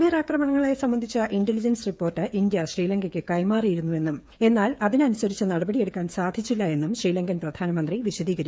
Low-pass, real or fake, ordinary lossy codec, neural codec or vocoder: none; fake; none; codec, 16 kHz, 8 kbps, FreqCodec, smaller model